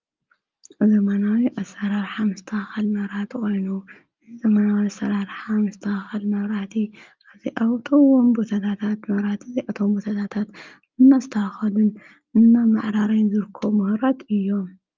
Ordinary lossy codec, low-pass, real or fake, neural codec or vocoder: Opus, 32 kbps; 7.2 kHz; real; none